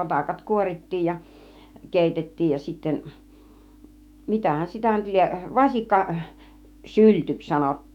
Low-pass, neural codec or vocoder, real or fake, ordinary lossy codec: 19.8 kHz; autoencoder, 48 kHz, 128 numbers a frame, DAC-VAE, trained on Japanese speech; fake; none